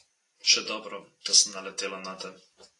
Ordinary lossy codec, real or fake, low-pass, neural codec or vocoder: AAC, 32 kbps; real; 10.8 kHz; none